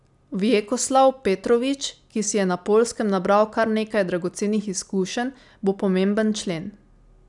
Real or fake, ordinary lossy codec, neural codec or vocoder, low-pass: real; none; none; 10.8 kHz